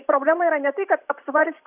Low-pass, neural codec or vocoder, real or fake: 3.6 kHz; vocoder, 44.1 kHz, 128 mel bands every 512 samples, BigVGAN v2; fake